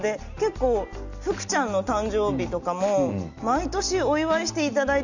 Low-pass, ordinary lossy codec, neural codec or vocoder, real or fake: 7.2 kHz; none; none; real